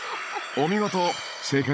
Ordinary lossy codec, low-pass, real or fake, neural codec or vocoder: none; none; fake; codec, 16 kHz, 16 kbps, FunCodec, trained on Chinese and English, 50 frames a second